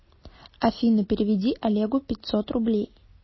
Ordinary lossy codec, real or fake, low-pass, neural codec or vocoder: MP3, 24 kbps; real; 7.2 kHz; none